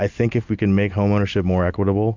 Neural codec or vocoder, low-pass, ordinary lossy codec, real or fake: none; 7.2 kHz; MP3, 64 kbps; real